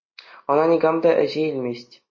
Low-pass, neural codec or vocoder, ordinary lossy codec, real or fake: 7.2 kHz; none; MP3, 32 kbps; real